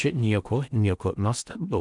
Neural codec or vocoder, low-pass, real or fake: codec, 16 kHz in and 24 kHz out, 0.8 kbps, FocalCodec, streaming, 65536 codes; 10.8 kHz; fake